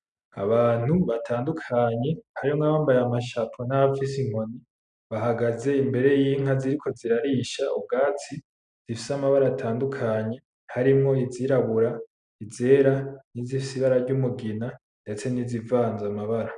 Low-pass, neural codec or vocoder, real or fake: 9.9 kHz; none; real